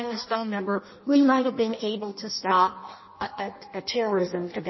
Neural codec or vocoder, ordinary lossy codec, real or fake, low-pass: codec, 16 kHz in and 24 kHz out, 0.6 kbps, FireRedTTS-2 codec; MP3, 24 kbps; fake; 7.2 kHz